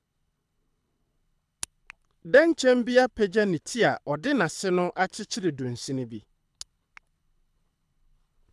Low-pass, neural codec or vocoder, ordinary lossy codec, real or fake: none; codec, 24 kHz, 6 kbps, HILCodec; none; fake